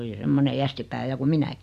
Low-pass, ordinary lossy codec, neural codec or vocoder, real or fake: 14.4 kHz; none; none; real